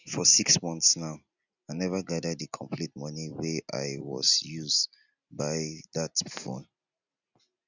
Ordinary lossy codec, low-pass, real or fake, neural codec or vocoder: none; 7.2 kHz; real; none